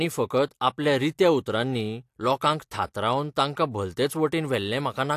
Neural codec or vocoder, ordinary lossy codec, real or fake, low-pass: none; AAC, 64 kbps; real; 14.4 kHz